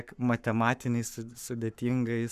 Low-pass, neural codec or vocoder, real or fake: 14.4 kHz; codec, 44.1 kHz, 7.8 kbps, Pupu-Codec; fake